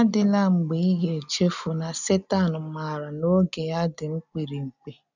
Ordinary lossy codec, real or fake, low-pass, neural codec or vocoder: none; real; 7.2 kHz; none